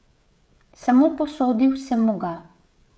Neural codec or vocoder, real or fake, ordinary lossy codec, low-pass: codec, 16 kHz, 16 kbps, FreqCodec, smaller model; fake; none; none